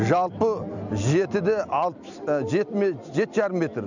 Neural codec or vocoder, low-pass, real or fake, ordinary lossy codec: vocoder, 44.1 kHz, 128 mel bands every 256 samples, BigVGAN v2; 7.2 kHz; fake; none